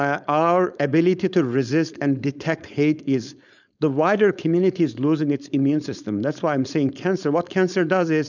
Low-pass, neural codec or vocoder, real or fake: 7.2 kHz; codec, 16 kHz, 4.8 kbps, FACodec; fake